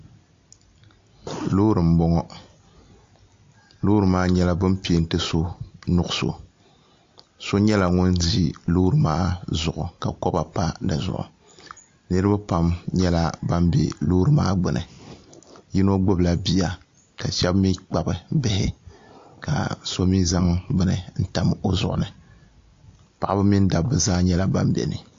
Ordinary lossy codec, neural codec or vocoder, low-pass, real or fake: AAC, 48 kbps; none; 7.2 kHz; real